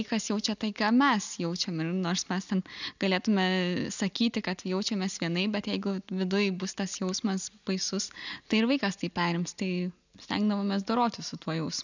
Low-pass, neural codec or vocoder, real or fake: 7.2 kHz; none; real